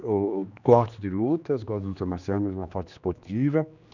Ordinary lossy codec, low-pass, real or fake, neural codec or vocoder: none; 7.2 kHz; fake; codec, 16 kHz, 2 kbps, X-Codec, HuBERT features, trained on general audio